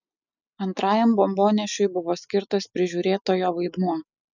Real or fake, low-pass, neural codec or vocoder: real; 7.2 kHz; none